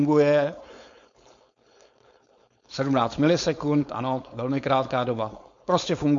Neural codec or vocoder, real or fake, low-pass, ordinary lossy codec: codec, 16 kHz, 4.8 kbps, FACodec; fake; 7.2 kHz; MP3, 48 kbps